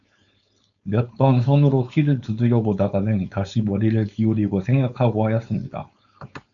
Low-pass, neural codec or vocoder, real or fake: 7.2 kHz; codec, 16 kHz, 4.8 kbps, FACodec; fake